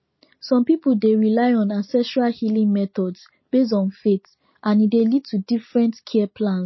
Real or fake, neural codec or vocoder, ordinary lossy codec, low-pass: real; none; MP3, 24 kbps; 7.2 kHz